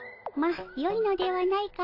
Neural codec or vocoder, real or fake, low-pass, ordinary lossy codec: none; real; 5.4 kHz; AAC, 32 kbps